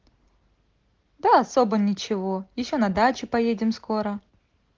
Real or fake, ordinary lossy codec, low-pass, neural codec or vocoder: real; Opus, 16 kbps; 7.2 kHz; none